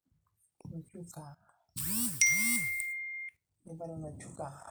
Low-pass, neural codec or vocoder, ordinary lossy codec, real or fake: none; none; none; real